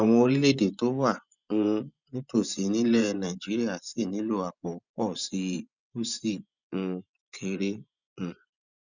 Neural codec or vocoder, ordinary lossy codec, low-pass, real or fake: vocoder, 24 kHz, 100 mel bands, Vocos; none; 7.2 kHz; fake